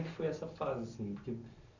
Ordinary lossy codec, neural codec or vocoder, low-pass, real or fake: none; none; 7.2 kHz; real